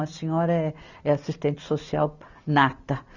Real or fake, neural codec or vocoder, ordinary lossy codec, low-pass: real; none; Opus, 64 kbps; 7.2 kHz